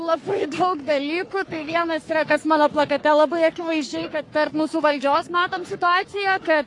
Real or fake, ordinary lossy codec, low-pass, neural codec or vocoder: fake; AAC, 48 kbps; 10.8 kHz; codec, 44.1 kHz, 3.4 kbps, Pupu-Codec